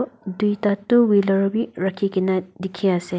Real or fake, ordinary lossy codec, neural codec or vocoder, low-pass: real; none; none; none